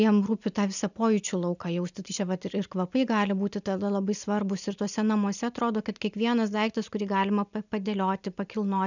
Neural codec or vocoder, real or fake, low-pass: none; real; 7.2 kHz